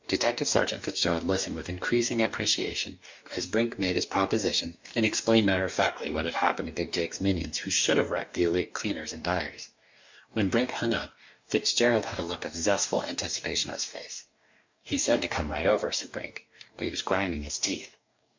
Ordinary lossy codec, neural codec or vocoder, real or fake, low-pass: MP3, 64 kbps; codec, 44.1 kHz, 2.6 kbps, DAC; fake; 7.2 kHz